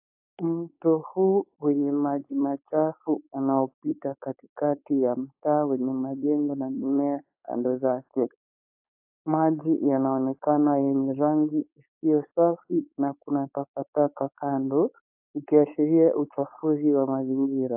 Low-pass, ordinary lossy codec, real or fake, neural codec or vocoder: 3.6 kHz; AAC, 32 kbps; fake; codec, 16 kHz, 4.8 kbps, FACodec